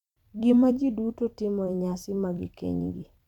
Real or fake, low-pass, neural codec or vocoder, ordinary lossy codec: fake; 19.8 kHz; vocoder, 44.1 kHz, 128 mel bands every 256 samples, BigVGAN v2; none